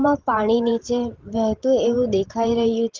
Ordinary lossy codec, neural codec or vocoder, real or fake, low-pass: Opus, 16 kbps; vocoder, 44.1 kHz, 128 mel bands every 512 samples, BigVGAN v2; fake; 7.2 kHz